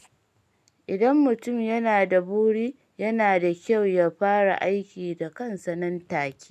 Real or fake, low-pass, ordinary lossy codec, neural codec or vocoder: real; 14.4 kHz; none; none